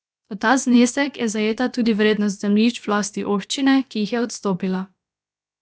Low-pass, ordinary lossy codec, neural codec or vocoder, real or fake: none; none; codec, 16 kHz, about 1 kbps, DyCAST, with the encoder's durations; fake